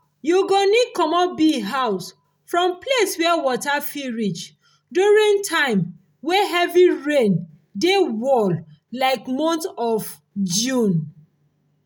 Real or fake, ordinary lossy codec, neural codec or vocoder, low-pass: real; none; none; none